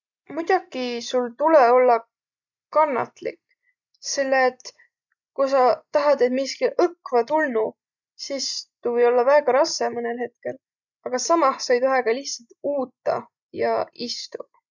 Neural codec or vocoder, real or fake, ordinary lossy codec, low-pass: none; real; none; 7.2 kHz